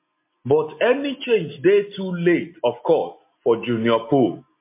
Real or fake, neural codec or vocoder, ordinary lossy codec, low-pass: real; none; MP3, 24 kbps; 3.6 kHz